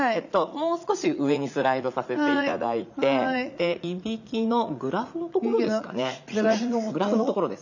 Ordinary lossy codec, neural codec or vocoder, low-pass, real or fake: none; vocoder, 44.1 kHz, 80 mel bands, Vocos; 7.2 kHz; fake